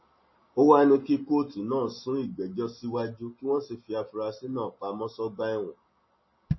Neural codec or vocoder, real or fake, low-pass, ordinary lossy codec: none; real; 7.2 kHz; MP3, 24 kbps